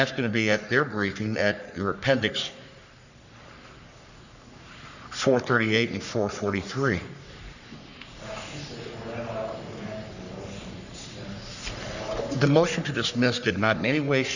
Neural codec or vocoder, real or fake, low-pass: codec, 44.1 kHz, 3.4 kbps, Pupu-Codec; fake; 7.2 kHz